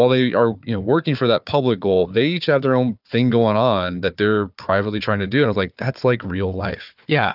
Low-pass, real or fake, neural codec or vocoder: 5.4 kHz; fake; codec, 16 kHz, 4 kbps, FunCodec, trained on Chinese and English, 50 frames a second